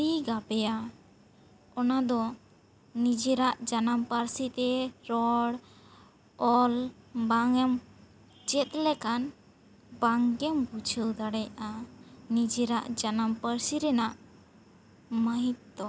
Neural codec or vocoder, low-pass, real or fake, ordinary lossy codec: none; none; real; none